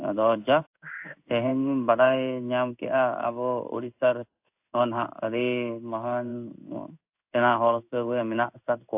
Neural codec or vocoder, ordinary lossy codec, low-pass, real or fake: codec, 16 kHz in and 24 kHz out, 1 kbps, XY-Tokenizer; AAC, 32 kbps; 3.6 kHz; fake